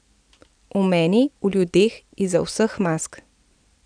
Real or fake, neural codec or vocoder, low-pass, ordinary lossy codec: real; none; 9.9 kHz; none